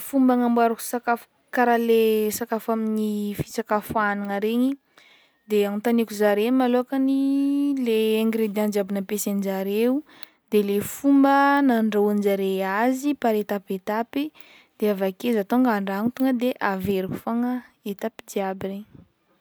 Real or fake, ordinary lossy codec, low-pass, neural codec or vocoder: real; none; none; none